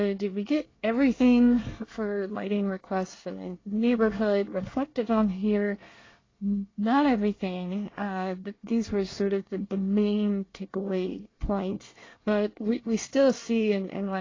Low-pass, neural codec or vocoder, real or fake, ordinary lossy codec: 7.2 kHz; codec, 24 kHz, 1 kbps, SNAC; fake; AAC, 32 kbps